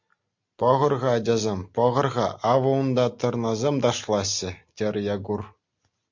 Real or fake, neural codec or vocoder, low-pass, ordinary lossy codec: real; none; 7.2 kHz; MP3, 48 kbps